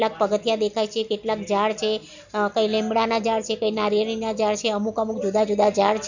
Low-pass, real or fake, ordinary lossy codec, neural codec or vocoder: 7.2 kHz; real; none; none